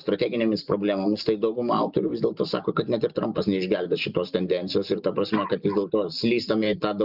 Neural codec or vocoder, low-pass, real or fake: none; 5.4 kHz; real